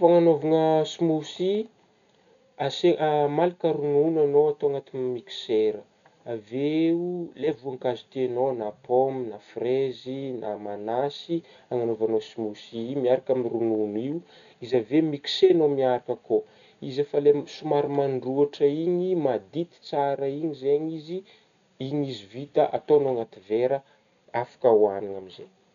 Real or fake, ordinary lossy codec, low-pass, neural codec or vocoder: real; none; 7.2 kHz; none